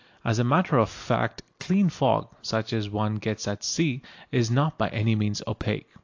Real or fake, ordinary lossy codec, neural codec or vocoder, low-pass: real; AAC, 48 kbps; none; 7.2 kHz